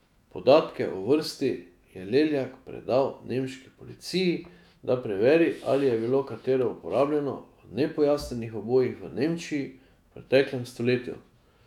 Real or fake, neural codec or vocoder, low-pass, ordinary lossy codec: fake; autoencoder, 48 kHz, 128 numbers a frame, DAC-VAE, trained on Japanese speech; 19.8 kHz; none